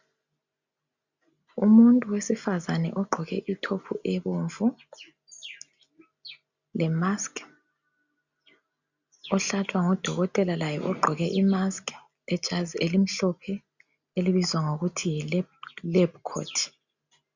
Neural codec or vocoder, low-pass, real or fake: none; 7.2 kHz; real